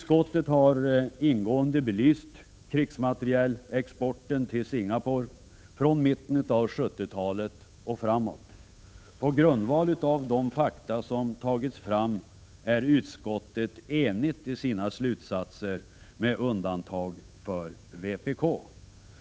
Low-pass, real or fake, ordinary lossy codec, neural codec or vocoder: none; fake; none; codec, 16 kHz, 8 kbps, FunCodec, trained on Chinese and English, 25 frames a second